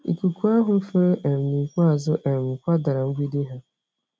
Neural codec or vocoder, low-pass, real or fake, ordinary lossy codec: none; none; real; none